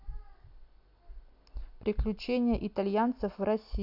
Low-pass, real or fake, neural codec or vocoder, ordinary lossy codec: 5.4 kHz; real; none; AAC, 48 kbps